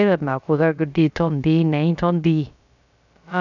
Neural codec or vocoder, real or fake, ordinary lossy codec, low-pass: codec, 16 kHz, about 1 kbps, DyCAST, with the encoder's durations; fake; none; 7.2 kHz